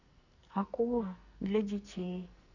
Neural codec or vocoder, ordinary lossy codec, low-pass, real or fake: codec, 44.1 kHz, 2.6 kbps, SNAC; none; 7.2 kHz; fake